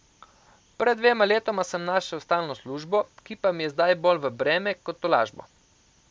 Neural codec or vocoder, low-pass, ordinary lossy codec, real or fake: none; none; none; real